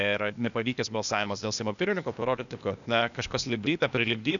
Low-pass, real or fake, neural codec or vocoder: 7.2 kHz; fake; codec, 16 kHz, 0.8 kbps, ZipCodec